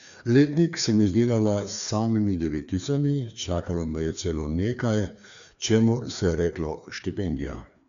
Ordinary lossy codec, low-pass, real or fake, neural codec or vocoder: none; 7.2 kHz; fake; codec, 16 kHz, 2 kbps, FreqCodec, larger model